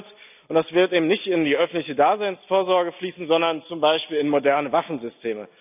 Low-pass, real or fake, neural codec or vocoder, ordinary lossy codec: 3.6 kHz; real; none; none